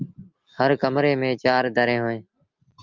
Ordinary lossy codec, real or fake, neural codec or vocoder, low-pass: Opus, 32 kbps; real; none; 7.2 kHz